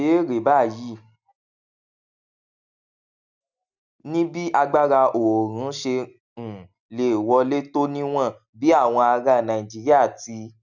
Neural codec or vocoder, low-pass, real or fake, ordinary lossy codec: none; 7.2 kHz; real; none